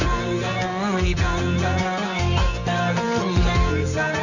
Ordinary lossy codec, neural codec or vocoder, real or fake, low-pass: none; codec, 24 kHz, 0.9 kbps, WavTokenizer, medium music audio release; fake; 7.2 kHz